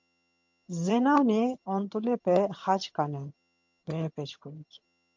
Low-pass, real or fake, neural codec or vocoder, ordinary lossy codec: 7.2 kHz; fake; vocoder, 22.05 kHz, 80 mel bands, HiFi-GAN; MP3, 48 kbps